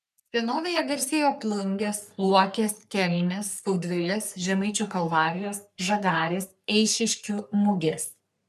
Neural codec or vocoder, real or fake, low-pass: codec, 44.1 kHz, 3.4 kbps, Pupu-Codec; fake; 14.4 kHz